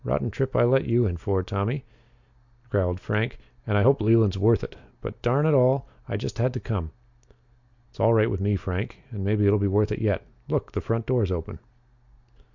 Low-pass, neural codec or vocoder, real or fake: 7.2 kHz; none; real